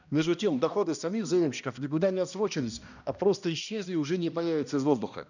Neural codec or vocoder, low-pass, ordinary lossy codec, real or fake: codec, 16 kHz, 1 kbps, X-Codec, HuBERT features, trained on balanced general audio; 7.2 kHz; none; fake